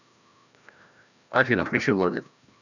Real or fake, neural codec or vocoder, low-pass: fake; codec, 16 kHz, 1 kbps, FreqCodec, larger model; 7.2 kHz